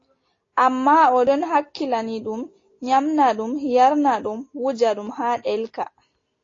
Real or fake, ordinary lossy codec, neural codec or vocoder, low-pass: real; AAC, 32 kbps; none; 7.2 kHz